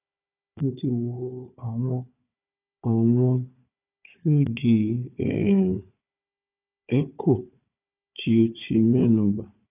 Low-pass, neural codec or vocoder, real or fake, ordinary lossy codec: 3.6 kHz; codec, 16 kHz, 4 kbps, FunCodec, trained on Chinese and English, 50 frames a second; fake; none